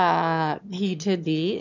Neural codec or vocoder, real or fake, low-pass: autoencoder, 22.05 kHz, a latent of 192 numbers a frame, VITS, trained on one speaker; fake; 7.2 kHz